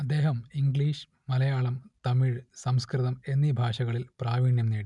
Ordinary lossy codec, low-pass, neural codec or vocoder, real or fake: none; 10.8 kHz; none; real